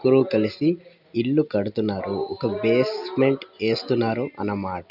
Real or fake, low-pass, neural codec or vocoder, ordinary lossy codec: real; 5.4 kHz; none; none